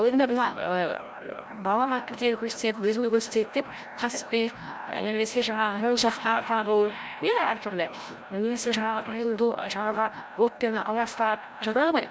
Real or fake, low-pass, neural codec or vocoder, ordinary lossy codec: fake; none; codec, 16 kHz, 0.5 kbps, FreqCodec, larger model; none